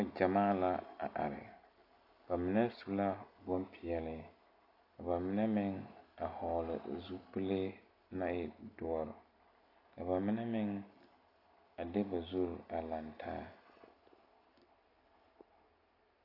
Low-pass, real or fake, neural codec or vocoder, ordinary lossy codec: 5.4 kHz; real; none; AAC, 32 kbps